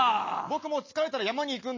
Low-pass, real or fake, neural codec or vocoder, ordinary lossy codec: 7.2 kHz; real; none; MP3, 32 kbps